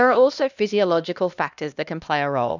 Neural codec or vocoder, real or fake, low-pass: codec, 16 kHz, 1 kbps, X-Codec, HuBERT features, trained on LibriSpeech; fake; 7.2 kHz